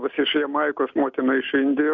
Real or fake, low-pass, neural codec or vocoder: real; 7.2 kHz; none